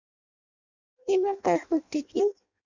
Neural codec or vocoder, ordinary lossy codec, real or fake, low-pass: codec, 16 kHz in and 24 kHz out, 0.6 kbps, FireRedTTS-2 codec; Opus, 64 kbps; fake; 7.2 kHz